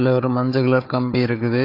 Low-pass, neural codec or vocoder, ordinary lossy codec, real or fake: 5.4 kHz; codec, 16 kHz, 4 kbps, FunCodec, trained on Chinese and English, 50 frames a second; AAC, 32 kbps; fake